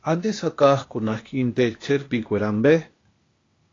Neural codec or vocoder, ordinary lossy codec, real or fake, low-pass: codec, 16 kHz, 0.8 kbps, ZipCodec; AAC, 32 kbps; fake; 7.2 kHz